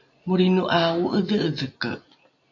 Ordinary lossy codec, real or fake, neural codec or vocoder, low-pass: MP3, 64 kbps; real; none; 7.2 kHz